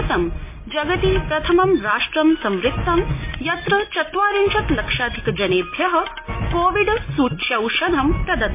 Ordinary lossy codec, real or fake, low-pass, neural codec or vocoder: MP3, 24 kbps; real; 3.6 kHz; none